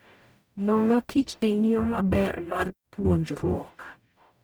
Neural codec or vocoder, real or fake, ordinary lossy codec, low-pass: codec, 44.1 kHz, 0.9 kbps, DAC; fake; none; none